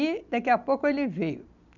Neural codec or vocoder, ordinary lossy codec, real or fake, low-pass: none; none; real; 7.2 kHz